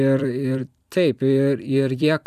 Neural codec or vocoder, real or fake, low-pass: vocoder, 44.1 kHz, 128 mel bands every 256 samples, BigVGAN v2; fake; 14.4 kHz